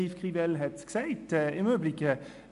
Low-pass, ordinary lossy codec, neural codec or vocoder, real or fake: 10.8 kHz; MP3, 64 kbps; none; real